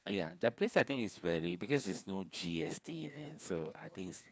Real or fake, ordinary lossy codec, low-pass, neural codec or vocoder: fake; none; none; codec, 16 kHz, 2 kbps, FreqCodec, larger model